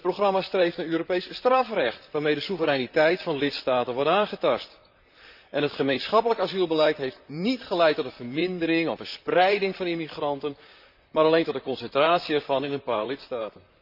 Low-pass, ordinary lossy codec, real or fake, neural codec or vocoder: 5.4 kHz; none; fake; vocoder, 44.1 kHz, 128 mel bands, Pupu-Vocoder